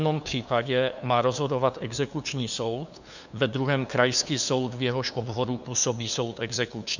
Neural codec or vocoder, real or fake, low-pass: codec, 16 kHz, 2 kbps, FunCodec, trained on LibriTTS, 25 frames a second; fake; 7.2 kHz